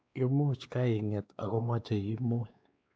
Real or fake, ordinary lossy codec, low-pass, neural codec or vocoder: fake; none; none; codec, 16 kHz, 2 kbps, X-Codec, WavLM features, trained on Multilingual LibriSpeech